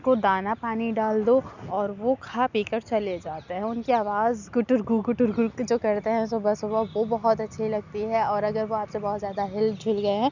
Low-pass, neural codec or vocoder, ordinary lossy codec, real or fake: 7.2 kHz; none; none; real